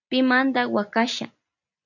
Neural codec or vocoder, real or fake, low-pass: none; real; 7.2 kHz